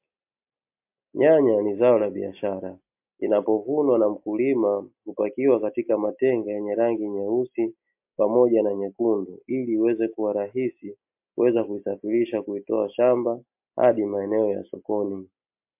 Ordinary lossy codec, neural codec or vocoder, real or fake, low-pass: MP3, 32 kbps; none; real; 3.6 kHz